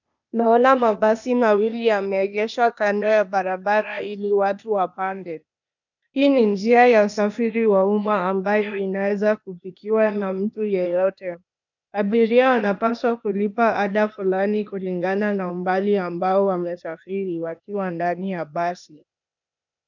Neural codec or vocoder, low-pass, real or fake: codec, 16 kHz, 0.8 kbps, ZipCodec; 7.2 kHz; fake